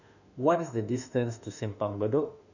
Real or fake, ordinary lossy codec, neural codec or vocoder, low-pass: fake; none; autoencoder, 48 kHz, 32 numbers a frame, DAC-VAE, trained on Japanese speech; 7.2 kHz